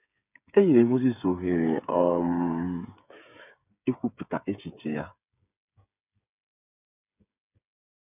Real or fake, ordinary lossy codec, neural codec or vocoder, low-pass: fake; none; codec, 16 kHz, 8 kbps, FreqCodec, smaller model; 3.6 kHz